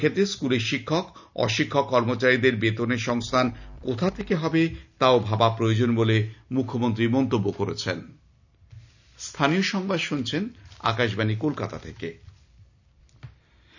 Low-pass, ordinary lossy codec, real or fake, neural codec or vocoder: 7.2 kHz; none; real; none